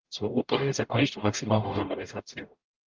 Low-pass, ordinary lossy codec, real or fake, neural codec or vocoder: 7.2 kHz; Opus, 32 kbps; fake; codec, 44.1 kHz, 0.9 kbps, DAC